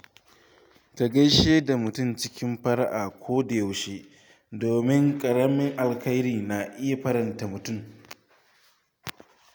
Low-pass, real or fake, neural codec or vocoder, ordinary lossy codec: none; real; none; none